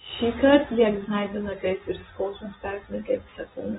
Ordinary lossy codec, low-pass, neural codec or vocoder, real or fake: AAC, 16 kbps; 10.8 kHz; vocoder, 24 kHz, 100 mel bands, Vocos; fake